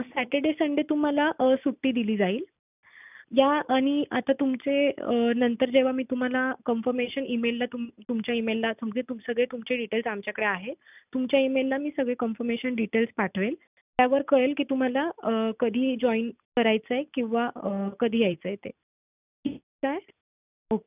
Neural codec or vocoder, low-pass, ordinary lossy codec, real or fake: none; 3.6 kHz; none; real